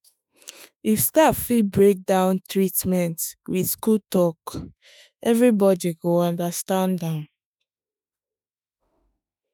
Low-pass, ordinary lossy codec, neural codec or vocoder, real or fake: none; none; autoencoder, 48 kHz, 32 numbers a frame, DAC-VAE, trained on Japanese speech; fake